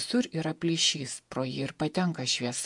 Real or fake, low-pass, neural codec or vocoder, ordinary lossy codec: fake; 10.8 kHz; vocoder, 48 kHz, 128 mel bands, Vocos; MP3, 64 kbps